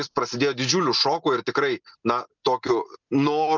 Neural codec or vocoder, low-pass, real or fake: none; 7.2 kHz; real